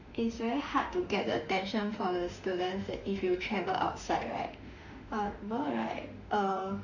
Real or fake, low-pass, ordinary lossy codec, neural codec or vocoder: fake; 7.2 kHz; none; autoencoder, 48 kHz, 32 numbers a frame, DAC-VAE, trained on Japanese speech